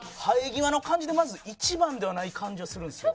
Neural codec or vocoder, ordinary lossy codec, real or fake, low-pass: none; none; real; none